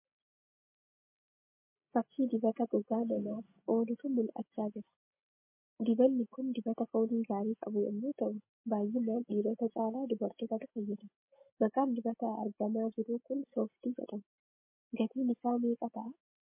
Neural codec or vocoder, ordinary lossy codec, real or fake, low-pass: none; MP3, 24 kbps; real; 3.6 kHz